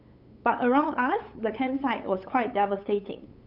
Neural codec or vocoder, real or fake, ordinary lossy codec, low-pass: codec, 16 kHz, 8 kbps, FunCodec, trained on LibriTTS, 25 frames a second; fake; none; 5.4 kHz